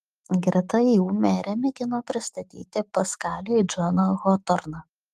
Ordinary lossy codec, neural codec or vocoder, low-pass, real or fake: Opus, 24 kbps; autoencoder, 48 kHz, 128 numbers a frame, DAC-VAE, trained on Japanese speech; 14.4 kHz; fake